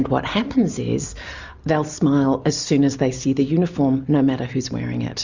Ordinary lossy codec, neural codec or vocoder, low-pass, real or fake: Opus, 64 kbps; none; 7.2 kHz; real